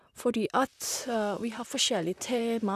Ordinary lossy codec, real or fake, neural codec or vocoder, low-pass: none; fake; vocoder, 44.1 kHz, 128 mel bands, Pupu-Vocoder; 14.4 kHz